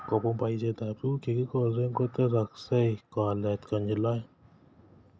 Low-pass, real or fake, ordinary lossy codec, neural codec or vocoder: none; real; none; none